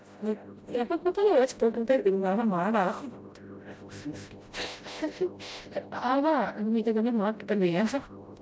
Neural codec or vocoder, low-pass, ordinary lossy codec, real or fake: codec, 16 kHz, 0.5 kbps, FreqCodec, smaller model; none; none; fake